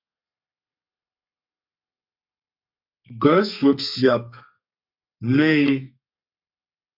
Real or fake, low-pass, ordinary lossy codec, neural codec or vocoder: fake; 5.4 kHz; MP3, 48 kbps; codec, 32 kHz, 1.9 kbps, SNAC